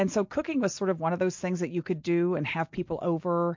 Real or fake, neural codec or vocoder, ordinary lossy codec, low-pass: real; none; MP3, 48 kbps; 7.2 kHz